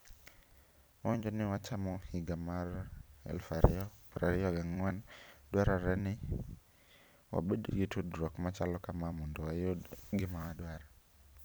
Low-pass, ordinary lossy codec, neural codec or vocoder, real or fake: none; none; vocoder, 44.1 kHz, 128 mel bands every 256 samples, BigVGAN v2; fake